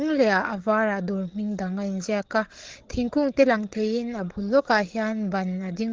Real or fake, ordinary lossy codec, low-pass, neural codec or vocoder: fake; Opus, 16 kbps; 7.2 kHz; codec, 16 kHz, 8 kbps, FreqCodec, larger model